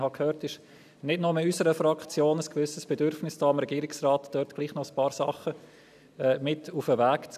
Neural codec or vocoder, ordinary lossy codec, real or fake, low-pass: none; none; real; 14.4 kHz